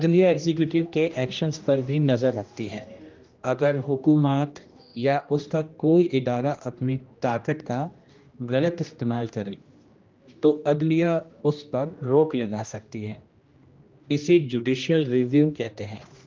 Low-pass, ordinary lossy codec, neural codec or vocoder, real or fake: 7.2 kHz; Opus, 24 kbps; codec, 16 kHz, 1 kbps, X-Codec, HuBERT features, trained on general audio; fake